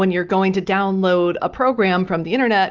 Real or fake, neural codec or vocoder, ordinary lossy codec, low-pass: real; none; Opus, 24 kbps; 7.2 kHz